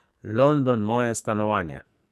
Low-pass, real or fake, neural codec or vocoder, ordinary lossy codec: 14.4 kHz; fake; codec, 44.1 kHz, 2.6 kbps, SNAC; none